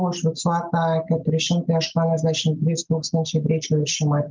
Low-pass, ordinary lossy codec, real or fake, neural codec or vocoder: 7.2 kHz; Opus, 24 kbps; real; none